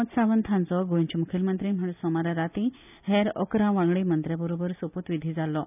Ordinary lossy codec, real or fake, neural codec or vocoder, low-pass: none; real; none; 3.6 kHz